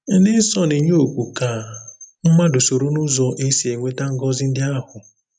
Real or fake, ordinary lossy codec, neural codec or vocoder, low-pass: real; none; none; 9.9 kHz